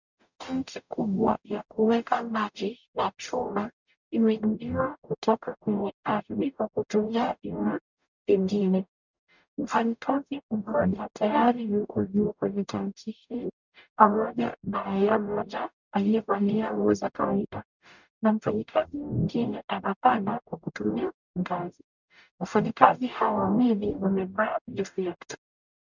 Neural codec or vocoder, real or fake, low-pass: codec, 44.1 kHz, 0.9 kbps, DAC; fake; 7.2 kHz